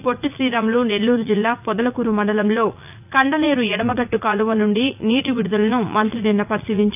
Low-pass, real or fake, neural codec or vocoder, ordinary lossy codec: 3.6 kHz; fake; vocoder, 44.1 kHz, 80 mel bands, Vocos; none